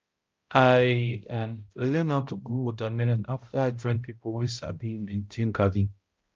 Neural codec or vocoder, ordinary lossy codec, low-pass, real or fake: codec, 16 kHz, 0.5 kbps, X-Codec, HuBERT features, trained on balanced general audio; Opus, 24 kbps; 7.2 kHz; fake